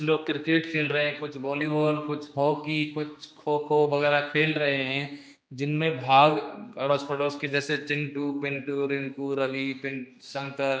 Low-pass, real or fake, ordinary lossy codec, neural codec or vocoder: none; fake; none; codec, 16 kHz, 2 kbps, X-Codec, HuBERT features, trained on general audio